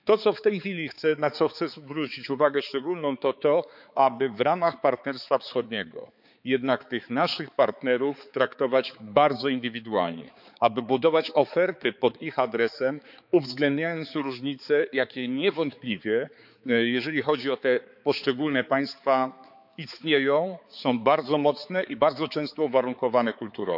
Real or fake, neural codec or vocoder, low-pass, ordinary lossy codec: fake; codec, 16 kHz, 4 kbps, X-Codec, HuBERT features, trained on balanced general audio; 5.4 kHz; none